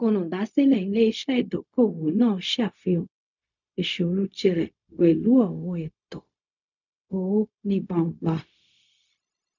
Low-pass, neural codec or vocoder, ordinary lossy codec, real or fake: 7.2 kHz; codec, 16 kHz, 0.4 kbps, LongCat-Audio-Codec; none; fake